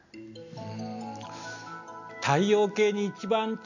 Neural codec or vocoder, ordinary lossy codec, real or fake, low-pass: none; none; real; 7.2 kHz